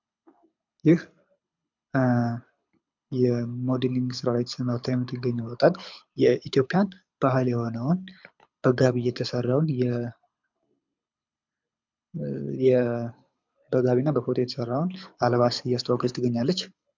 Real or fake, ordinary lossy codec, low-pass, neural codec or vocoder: fake; MP3, 64 kbps; 7.2 kHz; codec, 24 kHz, 6 kbps, HILCodec